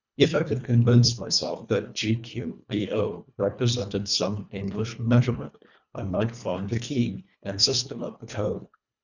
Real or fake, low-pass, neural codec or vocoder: fake; 7.2 kHz; codec, 24 kHz, 1.5 kbps, HILCodec